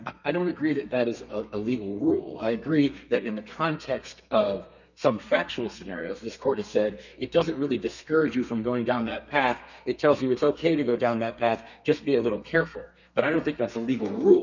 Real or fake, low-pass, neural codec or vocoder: fake; 7.2 kHz; codec, 32 kHz, 1.9 kbps, SNAC